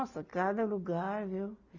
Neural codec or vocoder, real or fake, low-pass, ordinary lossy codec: none; real; 7.2 kHz; none